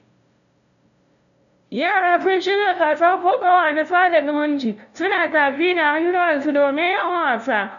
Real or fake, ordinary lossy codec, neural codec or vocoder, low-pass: fake; none; codec, 16 kHz, 0.5 kbps, FunCodec, trained on LibriTTS, 25 frames a second; 7.2 kHz